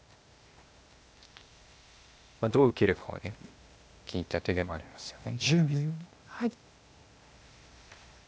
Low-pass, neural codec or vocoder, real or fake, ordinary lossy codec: none; codec, 16 kHz, 0.8 kbps, ZipCodec; fake; none